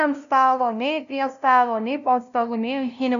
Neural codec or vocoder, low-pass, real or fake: codec, 16 kHz, 0.5 kbps, FunCodec, trained on LibriTTS, 25 frames a second; 7.2 kHz; fake